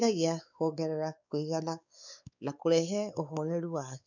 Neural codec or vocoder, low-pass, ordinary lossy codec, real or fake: codec, 16 kHz, 4 kbps, X-Codec, WavLM features, trained on Multilingual LibriSpeech; 7.2 kHz; none; fake